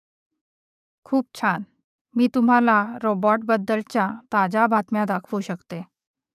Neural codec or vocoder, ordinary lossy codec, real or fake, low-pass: codec, 44.1 kHz, 7.8 kbps, DAC; none; fake; 14.4 kHz